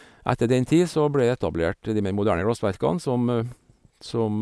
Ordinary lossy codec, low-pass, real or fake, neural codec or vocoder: none; none; real; none